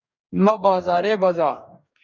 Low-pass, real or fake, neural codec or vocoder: 7.2 kHz; fake; codec, 44.1 kHz, 2.6 kbps, DAC